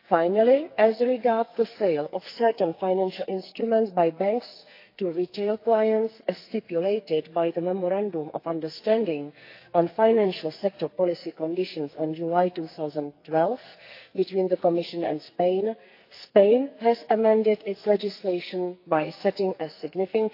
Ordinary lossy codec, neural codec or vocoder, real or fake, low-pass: AAC, 32 kbps; codec, 44.1 kHz, 2.6 kbps, SNAC; fake; 5.4 kHz